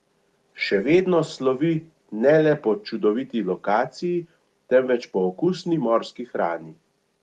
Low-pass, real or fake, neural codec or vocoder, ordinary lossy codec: 14.4 kHz; real; none; Opus, 24 kbps